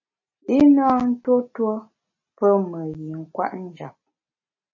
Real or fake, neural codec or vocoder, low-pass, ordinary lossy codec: real; none; 7.2 kHz; MP3, 32 kbps